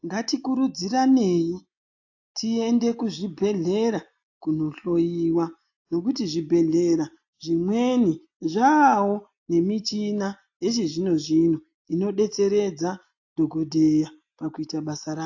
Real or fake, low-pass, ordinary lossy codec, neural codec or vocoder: real; 7.2 kHz; AAC, 48 kbps; none